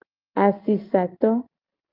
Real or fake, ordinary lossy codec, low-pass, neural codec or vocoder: real; Opus, 24 kbps; 5.4 kHz; none